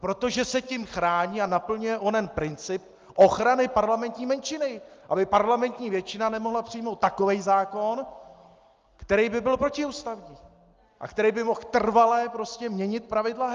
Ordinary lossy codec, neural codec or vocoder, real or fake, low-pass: Opus, 32 kbps; none; real; 7.2 kHz